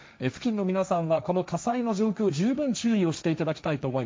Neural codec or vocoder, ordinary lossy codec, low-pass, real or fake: codec, 16 kHz, 1.1 kbps, Voila-Tokenizer; none; none; fake